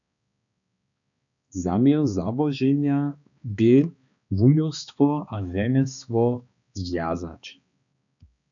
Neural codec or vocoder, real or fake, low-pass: codec, 16 kHz, 2 kbps, X-Codec, HuBERT features, trained on balanced general audio; fake; 7.2 kHz